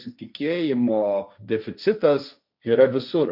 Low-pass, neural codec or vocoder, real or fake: 5.4 kHz; codec, 16 kHz, 1.1 kbps, Voila-Tokenizer; fake